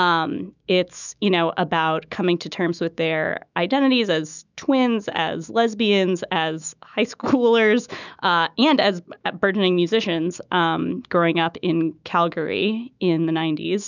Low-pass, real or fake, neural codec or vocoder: 7.2 kHz; fake; autoencoder, 48 kHz, 128 numbers a frame, DAC-VAE, trained on Japanese speech